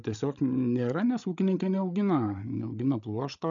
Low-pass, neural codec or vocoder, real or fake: 7.2 kHz; codec, 16 kHz, 8 kbps, FreqCodec, larger model; fake